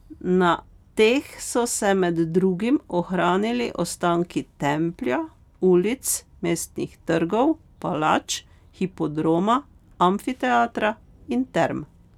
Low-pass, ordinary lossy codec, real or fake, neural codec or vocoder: 19.8 kHz; none; real; none